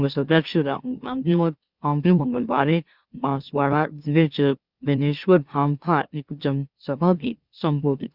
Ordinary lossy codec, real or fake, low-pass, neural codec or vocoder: Opus, 64 kbps; fake; 5.4 kHz; autoencoder, 44.1 kHz, a latent of 192 numbers a frame, MeloTTS